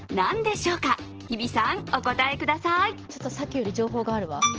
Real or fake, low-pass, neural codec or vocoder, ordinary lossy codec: real; 7.2 kHz; none; Opus, 16 kbps